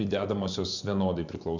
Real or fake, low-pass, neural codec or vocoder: fake; 7.2 kHz; vocoder, 24 kHz, 100 mel bands, Vocos